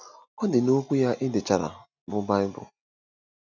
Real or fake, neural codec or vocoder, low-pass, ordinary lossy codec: real; none; 7.2 kHz; none